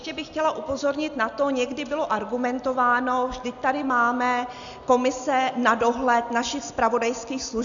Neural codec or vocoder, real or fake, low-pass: none; real; 7.2 kHz